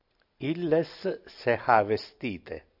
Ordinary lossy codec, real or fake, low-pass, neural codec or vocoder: AAC, 48 kbps; real; 5.4 kHz; none